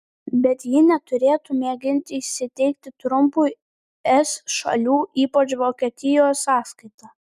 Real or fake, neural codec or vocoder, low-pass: real; none; 14.4 kHz